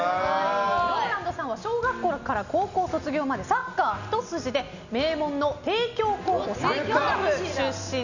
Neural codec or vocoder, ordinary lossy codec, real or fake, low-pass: none; none; real; 7.2 kHz